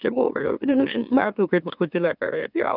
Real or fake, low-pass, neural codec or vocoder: fake; 5.4 kHz; autoencoder, 44.1 kHz, a latent of 192 numbers a frame, MeloTTS